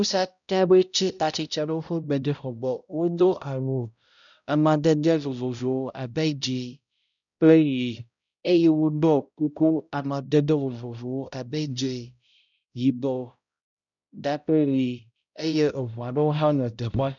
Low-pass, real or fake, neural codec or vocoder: 7.2 kHz; fake; codec, 16 kHz, 0.5 kbps, X-Codec, HuBERT features, trained on balanced general audio